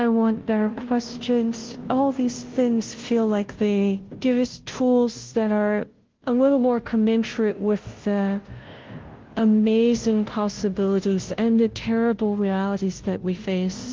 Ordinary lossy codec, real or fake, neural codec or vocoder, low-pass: Opus, 16 kbps; fake; codec, 16 kHz, 0.5 kbps, FunCodec, trained on Chinese and English, 25 frames a second; 7.2 kHz